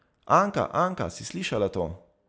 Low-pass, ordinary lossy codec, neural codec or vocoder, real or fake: none; none; none; real